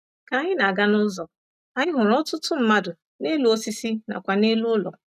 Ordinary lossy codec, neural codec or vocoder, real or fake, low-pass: none; none; real; 14.4 kHz